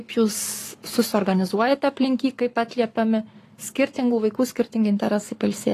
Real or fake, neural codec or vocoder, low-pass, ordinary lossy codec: fake; codec, 44.1 kHz, 7.8 kbps, Pupu-Codec; 14.4 kHz; AAC, 48 kbps